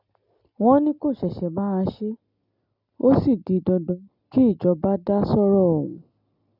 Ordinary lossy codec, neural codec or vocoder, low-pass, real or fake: none; none; 5.4 kHz; real